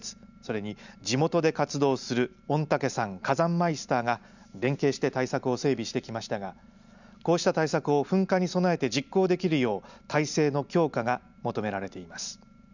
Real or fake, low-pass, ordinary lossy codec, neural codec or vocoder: real; 7.2 kHz; none; none